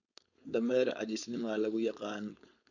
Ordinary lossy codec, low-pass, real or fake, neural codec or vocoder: none; 7.2 kHz; fake; codec, 16 kHz, 4.8 kbps, FACodec